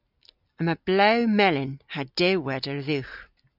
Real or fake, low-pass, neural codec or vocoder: real; 5.4 kHz; none